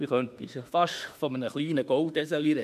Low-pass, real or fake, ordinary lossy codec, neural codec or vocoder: 14.4 kHz; fake; AAC, 96 kbps; autoencoder, 48 kHz, 32 numbers a frame, DAC-VAE, trained on Japanese speech